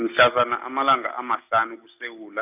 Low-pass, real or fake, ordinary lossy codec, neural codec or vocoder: 3.6 kHz; real; none; none